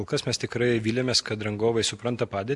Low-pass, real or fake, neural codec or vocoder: 10.8 kHz; real; none